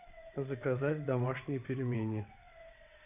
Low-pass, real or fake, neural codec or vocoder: 3.6 kHz; fake; vocoder, 22.05 kHz, 80 mel bands, WaveNeXt